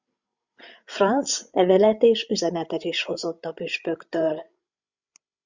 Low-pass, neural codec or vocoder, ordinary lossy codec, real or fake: 7.2 kHz; codec, 16 kHz, 16 kbps, FreqCodec, larger model; Opus, 64 kbps; fake